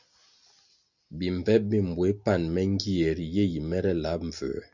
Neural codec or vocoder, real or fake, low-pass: none; real; 7.2 kHz